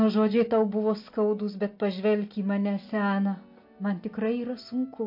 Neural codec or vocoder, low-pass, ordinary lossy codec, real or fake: none; 5.4 kHz; MP3, 32 kbps; real